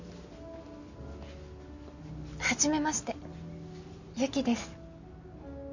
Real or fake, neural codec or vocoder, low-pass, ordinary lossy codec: real; none; 7.2 kHz; none